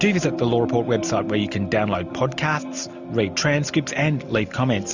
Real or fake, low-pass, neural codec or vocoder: real; 7.2 kHz; none